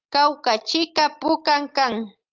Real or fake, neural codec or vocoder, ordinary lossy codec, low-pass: real; none; Opus, 24 kbps; 7.2 kHz